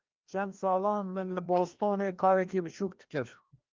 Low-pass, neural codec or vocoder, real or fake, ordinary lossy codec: 7.2 kHz; codec, 16 kHz, 1 kbps, FreqCodec, larger model; fake; Opus, 32 kbps